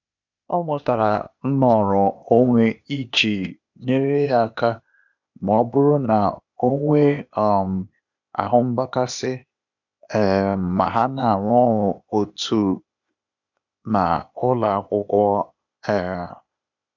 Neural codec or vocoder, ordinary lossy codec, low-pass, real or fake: codec, 16 kHz, 0.8 kbps, ZipCodec; none; 7.2 kHz; fake